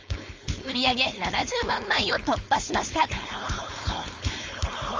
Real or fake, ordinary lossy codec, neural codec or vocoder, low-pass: fake; Opus, 32 kbps; codec, 16 kHz, 4.8 kbps, FACodec; 7.2 kHz